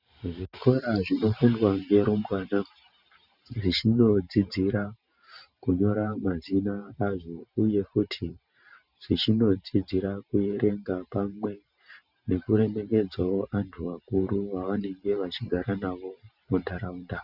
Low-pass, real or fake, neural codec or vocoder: 5.4 kHz; fake; vocoder, 44.1 kHz, 128 mel bands every 512 samples, BigVGAN v2